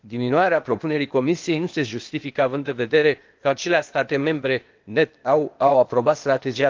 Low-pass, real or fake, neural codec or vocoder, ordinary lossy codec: 7.2 kHz; fake; codec, 16 kHz, 0.8 kbps, ZipCodec; Opus, 24 kbps